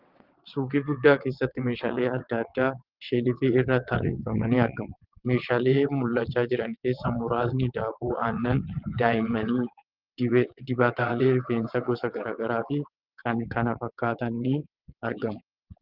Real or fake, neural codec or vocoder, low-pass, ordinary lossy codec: fake; vocoder, 22.05 kHz, 80 mel bands, WaveNeXt; 5.4 kHz; Opus, 24 kbps